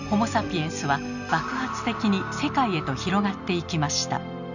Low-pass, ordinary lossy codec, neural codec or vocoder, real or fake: 7.2 kHz; none; none; real